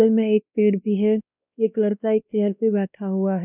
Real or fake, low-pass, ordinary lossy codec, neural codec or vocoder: fake; 3.6 kHz; none; codec, 16 kHz, 1 kbps, X-Codec, WavLM features, trained on Multilingual LibriSpeech